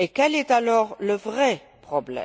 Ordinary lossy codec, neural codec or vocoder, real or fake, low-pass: none; none; real; none